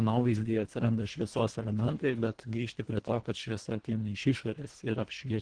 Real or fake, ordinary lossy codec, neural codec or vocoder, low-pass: fake; Opus, 16 kbps; codec, 24 kHz, 1.5 kbps, HILCodec; 9.9 kHz